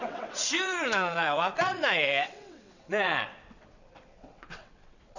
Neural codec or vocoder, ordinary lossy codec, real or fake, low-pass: vocoder, 22.05 kHz, 80 mel bands, WaveNeXt; none; fake; 7.2 kHz